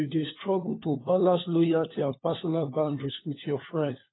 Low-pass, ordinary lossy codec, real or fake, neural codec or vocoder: 7.2 kHz; AAC, 16 kbps; fake; codec, 16 kHz, 4 kbps, FunCodec, trained on LibriTTS, 50 frames a second